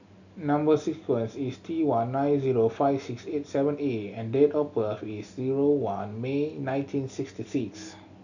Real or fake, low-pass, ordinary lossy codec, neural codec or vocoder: real; 7.2 kHz; none; none